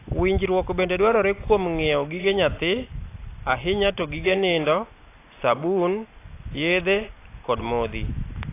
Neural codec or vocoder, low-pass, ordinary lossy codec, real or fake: none; 3.6 kHz; AAC, 24 kbps; real